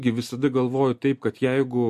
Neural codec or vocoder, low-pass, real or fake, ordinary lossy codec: none; 14.4 kHz; real; MP3, 64 kbps